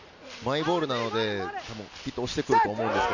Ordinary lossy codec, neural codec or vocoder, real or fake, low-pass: none; none; real; 7.2 kHz